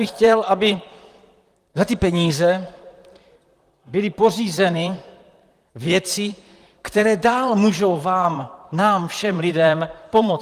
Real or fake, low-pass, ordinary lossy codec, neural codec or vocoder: fake; 14.4 kHz; Opus, 32 kbps; vocoder, 44.1 kHz, 128 mel bands, Pupu-Vocoder